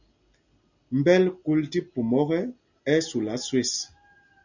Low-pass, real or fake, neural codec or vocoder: 7.2 kHz; real; none